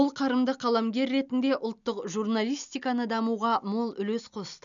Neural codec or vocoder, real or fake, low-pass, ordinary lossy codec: none; real; 7.2 kHz; none